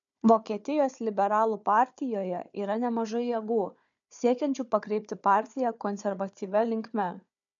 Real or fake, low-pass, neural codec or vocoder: fake; 7.2 kHz; codec, 16 kHz, 4 kbps, FunCodec, trained on Chinese and English, 50 frames a second